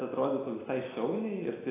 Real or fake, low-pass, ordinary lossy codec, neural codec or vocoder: real; 3.6 kHz; AAC, 16 kbps; none